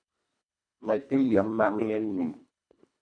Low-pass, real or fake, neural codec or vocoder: 9.9 kHz; fake; codec, 24 kHz, 1.5 kbps, HILCodec